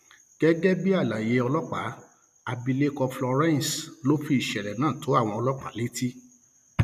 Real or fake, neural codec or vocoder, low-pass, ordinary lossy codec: real; none; 14.4 kHz; none